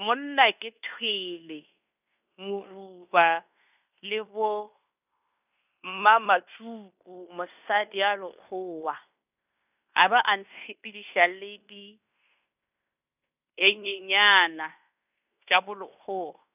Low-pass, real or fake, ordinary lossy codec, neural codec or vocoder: 3.6 kHz; fake; none; codec, 16 kHz in and 24 kHz out, 0.9 kbps, LongCat-Audio-Codec, fine tuned four codebook decoder